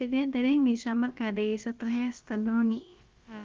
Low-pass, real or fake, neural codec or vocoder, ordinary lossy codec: 7.2 kHz; fake; codec, 16 kHz, about 1 kbps, DyCAST, with the encoder's durations; Opus, 24 kbps